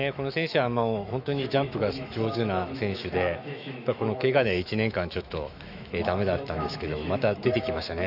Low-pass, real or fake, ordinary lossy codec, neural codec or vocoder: 5.4 kHz; fake; none; autoencoder, 48 kHz, 128 numbers a frame, DAC-VAE, trained on Japanese speech